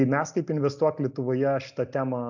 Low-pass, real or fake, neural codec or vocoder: 7.2 kHz; real; none